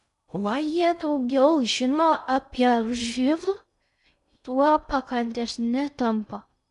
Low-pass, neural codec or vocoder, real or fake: 10.8 kHz; codec, 16 kHz in and 24 kHz out, 0.6 kbps, FocalCodec, streaming, 2048 codes; fake